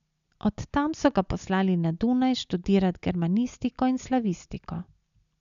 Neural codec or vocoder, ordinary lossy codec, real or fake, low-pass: none; MP3, 96 kbps; real; 7.2 kHz